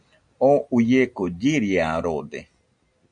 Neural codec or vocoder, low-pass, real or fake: none; 9.9 kHz; real